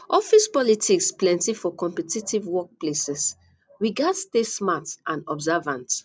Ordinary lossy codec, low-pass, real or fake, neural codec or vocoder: none; none; real; none